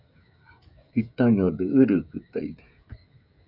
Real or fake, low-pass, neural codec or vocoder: fake; 5.4 kHz; codec, 16 kHz, 16 kbps, FreqCodec, smaller model